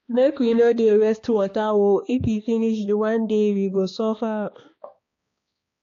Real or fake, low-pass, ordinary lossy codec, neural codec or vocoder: fake; 7.2 kHz; AAC, 48 kbps; codec, 16 kHz, 2 kbps, X-Codec, HuBERT features, trained on balanced general audio